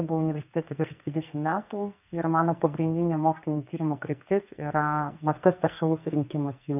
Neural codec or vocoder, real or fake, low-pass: codec, 44.1 kHz, 2.6 kbps, SNAC; fake; 3.6 kHz